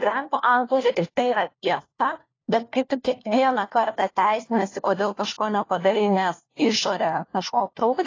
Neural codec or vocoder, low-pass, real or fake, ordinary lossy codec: codec, 16 kHz, 1 kbps, FunCodec, trained on LibriTTS, 50 frames a second; 7.2 kHz; fake; AAC, 32 kbps